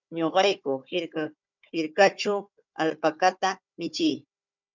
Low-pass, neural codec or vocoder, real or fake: 7.2 kHz; codec, 16 kHz, 4 kbps, FunCodec, trained on Chinese and English, 50 frames a second; fake